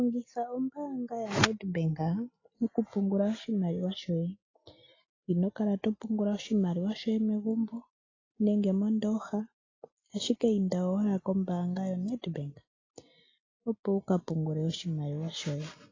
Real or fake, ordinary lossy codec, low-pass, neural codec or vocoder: real; AAC, 32 kbps; 7.2 kHz; none